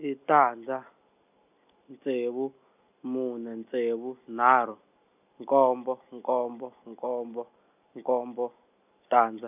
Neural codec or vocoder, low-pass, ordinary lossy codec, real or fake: none; 3.6 kHz; none; real